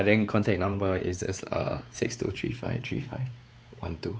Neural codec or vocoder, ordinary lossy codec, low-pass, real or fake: codec, 16 kHz, 4 kbps, X-Codec, WavLM features, trained on Multilingual LibriSpeech; none; none; fake